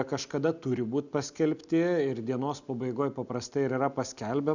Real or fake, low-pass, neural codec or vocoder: real; 7.2 kHz; none